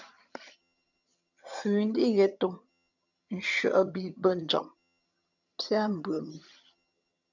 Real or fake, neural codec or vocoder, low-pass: fake; vocoder, 22.05 kHz, 80 mel bands, HiFi-GAN; 7.2 kHz